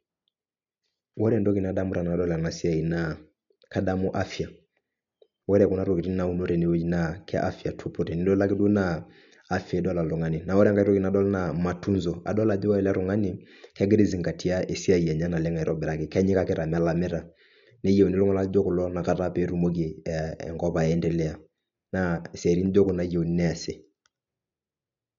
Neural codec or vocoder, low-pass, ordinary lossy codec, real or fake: none; 7.2 kHz; MP3, 64 kbps; real